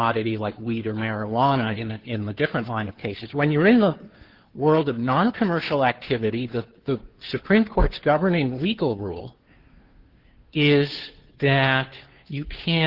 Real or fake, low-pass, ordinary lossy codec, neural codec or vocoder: fake; 5.4 kHz; Opus, 16 kbps; codec, 16 kHz, 2 kbps, FunCodec, trained on Chinese and English, 25 frames a second